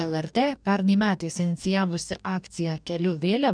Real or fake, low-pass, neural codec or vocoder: fake; 9.9 kHz; codec, 44.1 kHz, 2.6 kbps, DAC